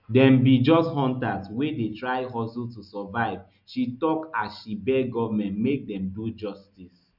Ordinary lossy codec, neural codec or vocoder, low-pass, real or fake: none; none; 5.4 kHz; real